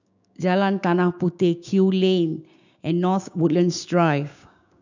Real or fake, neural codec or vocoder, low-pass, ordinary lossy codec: fake; codec, 16 kHz, 6 kbps, DAC; 7.2 kHz; none